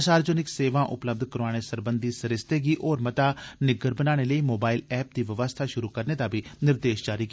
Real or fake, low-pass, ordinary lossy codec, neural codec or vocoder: real; none; none; none